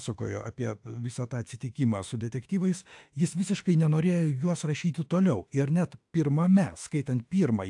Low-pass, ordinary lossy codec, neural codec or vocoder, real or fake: 10.8 kHz; AAC, 64 kbps; autoencoder, 48 kHz, 32 numbers a frame, DAC-VAE, trained on Japanese speech; fake